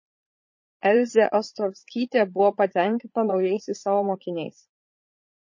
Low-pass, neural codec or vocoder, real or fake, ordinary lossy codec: 7.2 kHz; vocoder, 44.1 kHz, 80 mel bands, Vocos; fake; MP3, 32 kbps